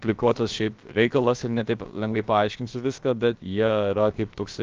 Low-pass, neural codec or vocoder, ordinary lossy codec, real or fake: 7.2 kHz; codec, 16 kHz, about 1 kbps, DyCAST, with the encoder's durations; Opus, 24 kbps; fake